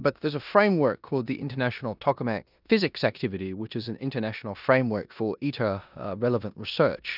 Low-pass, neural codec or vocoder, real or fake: 5.4 kHz; codec, 16 kHz in and 24 kHz out, 0.9 kbps, LongCat-Audio-Codec, four codebook decoder; fake